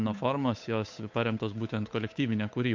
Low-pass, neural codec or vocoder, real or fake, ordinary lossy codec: 7.2 kHz; codec, 16 kHz, 8 kbps, FunCodec, trained on Chinese and English, 25 frames a second; fake; MP3, 64 kbps